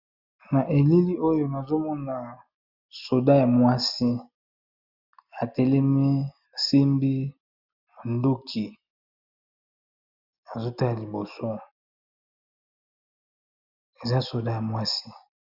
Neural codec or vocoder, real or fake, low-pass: none; real; 5.4 kHz